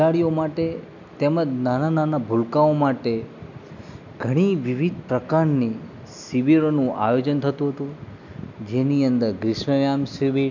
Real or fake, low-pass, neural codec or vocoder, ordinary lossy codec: real; 7.2 kHz; none; none